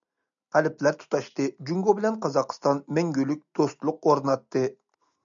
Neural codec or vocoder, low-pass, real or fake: none; 7.2 kHz; real